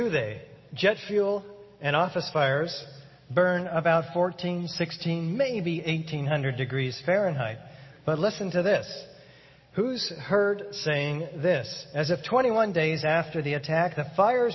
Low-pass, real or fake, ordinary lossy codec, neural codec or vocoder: 7.2 kHz; real; MP3, 24 kbps; none